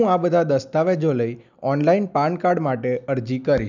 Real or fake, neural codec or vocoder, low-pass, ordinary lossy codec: real; none; 7.2 kHz; none